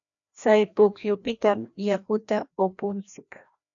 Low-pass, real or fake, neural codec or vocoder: 7.2 kHz; fake; codec, 16 kHz, 1 kbps, FreqCodec, larger model